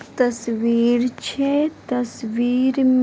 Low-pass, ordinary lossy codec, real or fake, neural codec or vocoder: none; none; real; none